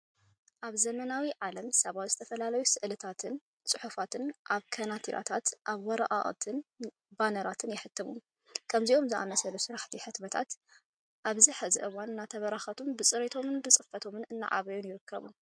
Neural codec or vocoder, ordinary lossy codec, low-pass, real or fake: none; MP3, 64 kbps; 9.9 kHz; real